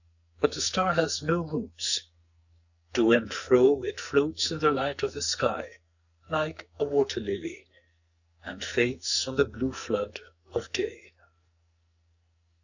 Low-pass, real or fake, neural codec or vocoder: 7.2 kHz; fake; codec, 44.1 kHz, 2.6 kbps, SNAC